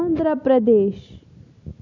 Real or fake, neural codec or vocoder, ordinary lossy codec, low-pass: real; none; none; 7.2 kHz